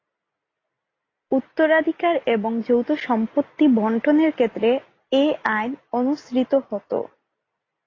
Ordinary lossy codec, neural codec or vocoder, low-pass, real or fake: AAC, 32 kbps; none; 7.2 kHz; real